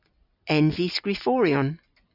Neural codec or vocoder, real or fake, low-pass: none; real; 5.4 kHz